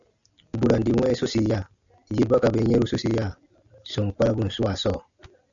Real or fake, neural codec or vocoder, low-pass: real; none; 7.2 kHz